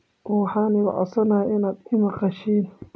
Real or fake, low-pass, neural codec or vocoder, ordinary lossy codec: real; none; none; none